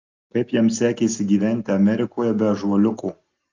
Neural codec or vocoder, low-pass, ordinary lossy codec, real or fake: none; 7.2 kHz; Opus, 24 kbps; real